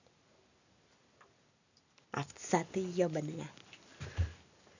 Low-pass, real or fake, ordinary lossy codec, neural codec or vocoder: 7.2 kHz; real; none; none